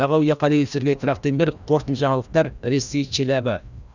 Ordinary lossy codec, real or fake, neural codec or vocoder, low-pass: none; fake; codec, 16 kHz, 1 kbps, FreqCodec, larger model; 7.2 kHz